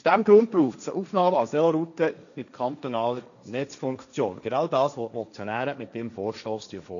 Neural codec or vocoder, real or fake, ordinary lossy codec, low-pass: codec, 16 kHz, 1.1 kbps, Voila-Tokenizer; fake; none; 7.2 kHz